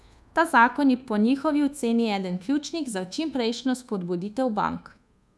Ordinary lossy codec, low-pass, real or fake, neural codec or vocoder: none; none; fake; codec, 24 kHz, 1.2 kbps, DualCodec